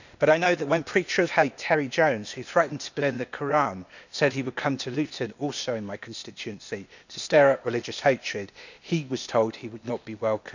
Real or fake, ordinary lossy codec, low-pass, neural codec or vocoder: fake; none; 7.2 kHz; codec, 16 kHz, 0.8 kbps, ZipCodec